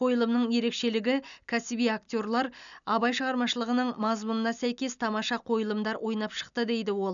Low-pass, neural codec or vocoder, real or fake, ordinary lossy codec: 7.2 kHz; none; real; none